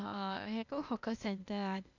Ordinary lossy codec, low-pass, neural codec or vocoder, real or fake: none; 7.2 kHz; codec, 16 kHz, 0.8 kbps, ZipCodec; fake